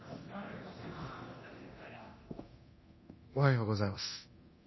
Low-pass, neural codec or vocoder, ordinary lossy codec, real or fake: 7.2 kHz; codec, 24 kHz, 0.9 kbps, DualCodec; MP3, 24 kbps; fake